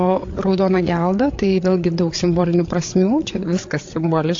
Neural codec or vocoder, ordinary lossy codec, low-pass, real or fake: codec, 16 kHz, 8 kbps, FreqCodec, larger model; AAC, 48 kbps; 7.2 kHz; fake